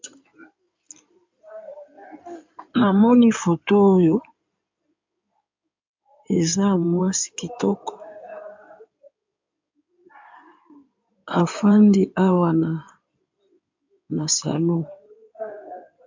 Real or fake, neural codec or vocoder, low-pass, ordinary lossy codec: fake; codec, 16 kHz in and 24 kHz out, 2.2 kbps, FireRedTTS-2 codec; 7.2 kHz; MP3, 64 kbps